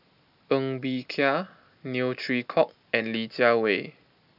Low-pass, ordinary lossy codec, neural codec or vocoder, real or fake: 5.4 kHz; none; none; real